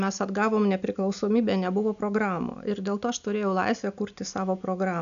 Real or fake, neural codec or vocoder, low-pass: real; none; 7.2 kHz